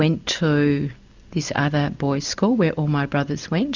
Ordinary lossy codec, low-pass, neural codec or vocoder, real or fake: Opus, 64 kbps; 7.2 kHz; none; real